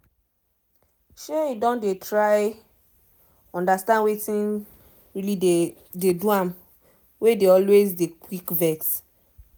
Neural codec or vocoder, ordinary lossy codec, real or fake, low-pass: none; none; real; none